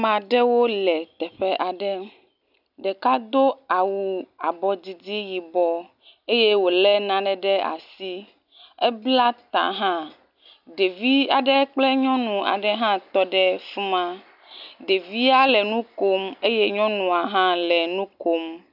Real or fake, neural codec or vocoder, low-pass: real; none; 5.4 kHz